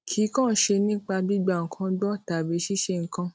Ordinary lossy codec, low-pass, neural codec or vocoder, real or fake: none; none; none; real